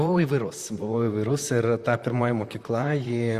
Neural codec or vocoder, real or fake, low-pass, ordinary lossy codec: vocoder, 44.1 kHz, 128 mel bands, Pupu-Vocoder; fake; 14.4 kHz; Opus, 64 kbps